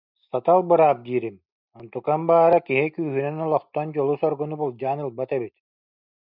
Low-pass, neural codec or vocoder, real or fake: 5.4 kHz; none; real